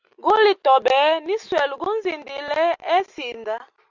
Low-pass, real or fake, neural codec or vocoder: 7.2 kHz; real; none